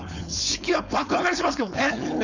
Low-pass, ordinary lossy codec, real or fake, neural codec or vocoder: 7.2 kHz; none; fake; codec, 16 kHz, 4.8 kbps, FACodec